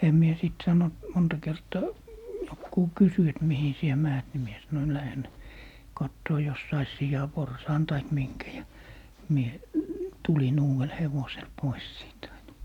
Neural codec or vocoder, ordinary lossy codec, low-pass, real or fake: none; none; 19.8 kHz; real